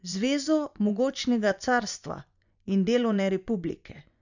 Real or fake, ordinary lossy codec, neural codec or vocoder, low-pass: fake; none; vocoder, 24 kHz, 100 mel bands, Vocos; 7.2 kHz